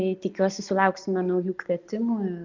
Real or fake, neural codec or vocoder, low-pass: real; none; 7.2 kHz